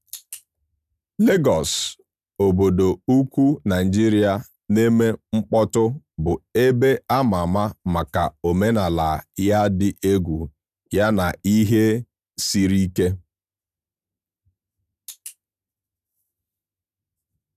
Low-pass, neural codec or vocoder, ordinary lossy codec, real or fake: 14.4 kHz; none; none; real